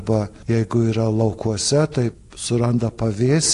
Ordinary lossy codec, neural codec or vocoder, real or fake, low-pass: AAC, 48 kbps; none; real; 10.8 kHz